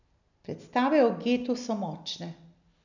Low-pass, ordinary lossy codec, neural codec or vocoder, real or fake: 7.2 kHz; none; none; real